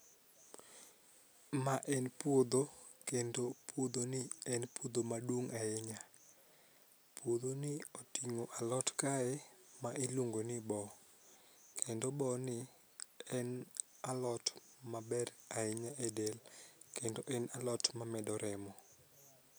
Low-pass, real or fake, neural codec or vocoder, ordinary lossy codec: none; real; none; none